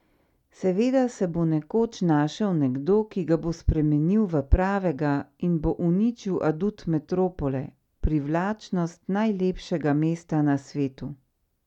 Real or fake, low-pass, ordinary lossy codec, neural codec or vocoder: real; 19.8 kHz; none; none